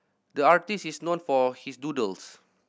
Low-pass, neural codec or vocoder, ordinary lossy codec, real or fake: none; none; none; real